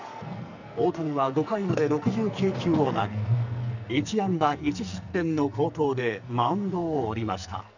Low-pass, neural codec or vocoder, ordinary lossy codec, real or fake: 7.2 kHz; codec, 44.1 kHz, 2.6 kbps, SNAC; none; fake